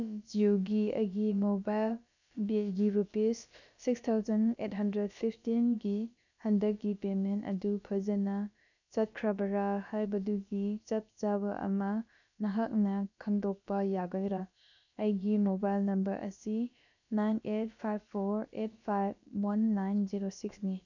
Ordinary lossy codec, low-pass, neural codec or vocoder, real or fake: none; 7.2 kHz; codec, 16 kHz, about 1 kbps, DyCAST, with the encoder's durations; fake